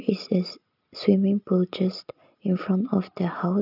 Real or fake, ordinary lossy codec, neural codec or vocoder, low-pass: real; none; none; 5.4 kHz